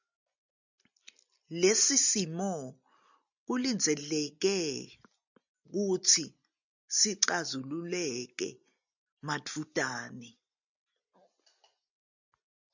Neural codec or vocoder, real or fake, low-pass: none; real; 7.2 kHz